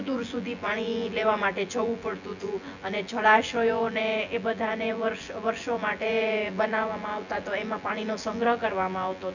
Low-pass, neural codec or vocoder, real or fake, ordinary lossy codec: 7.2 kHz; vocoder, 24 kHz, 100 mel bands, Vocos; fake; none